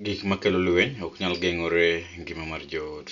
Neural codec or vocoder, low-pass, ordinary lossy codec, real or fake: none; 7.2 kHz; none; real